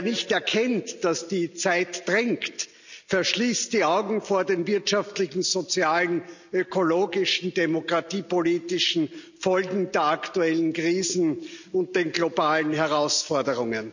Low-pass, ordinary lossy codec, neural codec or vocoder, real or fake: 7.2 kHz; none; none; real